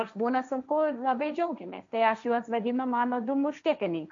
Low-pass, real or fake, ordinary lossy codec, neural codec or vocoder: 7.2 kHz; fake; AAC, 64 kbps; codec, 16 kHz, 1.1 kbps, Voila-Tokenizer